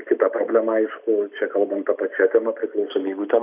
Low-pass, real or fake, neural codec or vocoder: 3.6 kHz; real; none